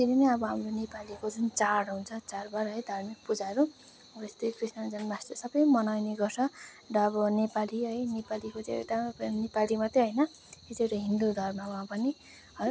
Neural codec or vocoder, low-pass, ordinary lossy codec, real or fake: none; none; none; real